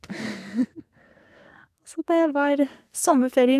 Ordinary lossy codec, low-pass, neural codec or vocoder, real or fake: none; 14.4 kHz; codec, 44.1 kHz, 2.6 kbps, SNAC; fake